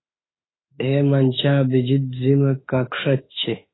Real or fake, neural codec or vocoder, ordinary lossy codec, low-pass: fake; autoencoder, 48 kHz, 32 numbers a frame, DAC-VAE, trained on Japanese speech; AAC, 16 kbps; 7.2 kHz